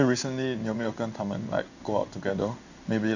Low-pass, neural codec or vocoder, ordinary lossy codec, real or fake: 7.2 kHz; codec, 16 kHz in and 24 kHz out, 1 kbps, XY-Tokenizer; none; fake